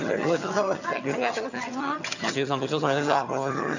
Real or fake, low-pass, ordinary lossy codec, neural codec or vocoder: fake; 7.2 kHz; none; vocoder, 22.05 kHz, 80 mel bands, HiFi-GAN